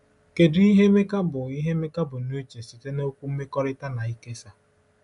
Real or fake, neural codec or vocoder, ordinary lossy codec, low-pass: real; none; none; 10.8 kHz